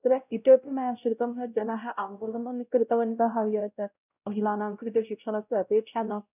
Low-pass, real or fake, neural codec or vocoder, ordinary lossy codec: 3.6 kHz; fake; codec, 16 kHz, 0.5 kbps, X-Codec, WavLM features, trained on Multilingual LibriSpeech; none